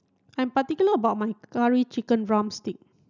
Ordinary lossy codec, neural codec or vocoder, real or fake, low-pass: none; none; real; 7.2 kHz